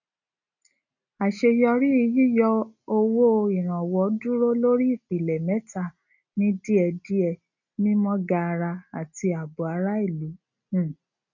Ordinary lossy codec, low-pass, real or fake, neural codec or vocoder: none; 7.2 kHz; real; none